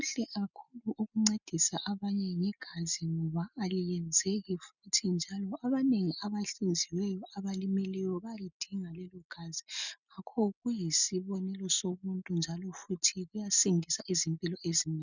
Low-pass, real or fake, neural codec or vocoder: 7.2 kHz; real; none